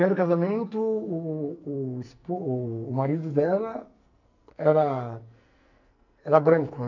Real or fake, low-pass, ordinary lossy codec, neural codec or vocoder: fake; 7.2 kHz; none; codec, 44.1 kHz, 2.6 kbps, SNAC